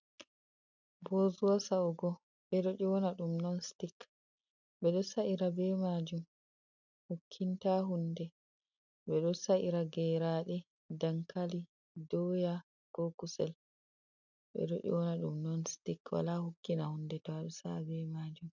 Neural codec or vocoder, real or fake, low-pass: none; real; 7.2 kHz